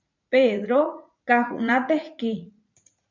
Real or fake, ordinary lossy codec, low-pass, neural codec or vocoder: fake; MP3, 64 kbps; 7.2 kHz; vocoder, 44.1 kHz, 80 mel bands, Vocos